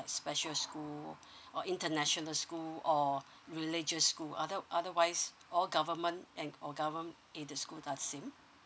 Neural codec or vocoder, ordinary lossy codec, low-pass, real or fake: none; none; none; real